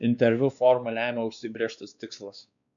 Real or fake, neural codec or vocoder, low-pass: fake; codec, 16 kHz, 2 kbps, X-Codec, WavLM features, trained on Multilingual LibriSpeech; 7.2 kHz